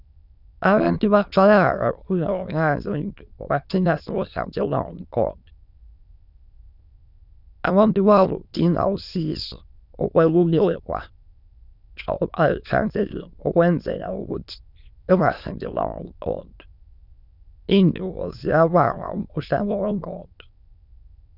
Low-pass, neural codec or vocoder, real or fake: 5.4 kHz; autoencoder, 22.05 kHz, a latent of 192 numbers a frame, VITS, trained on many speakers; fake